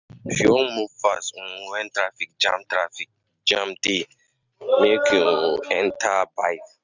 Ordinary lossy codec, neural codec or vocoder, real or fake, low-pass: none; none; real; 7.2 kHz